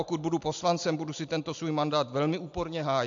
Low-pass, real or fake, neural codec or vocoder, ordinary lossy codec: 7.2 kHz; real; none; AAC, 64 kbps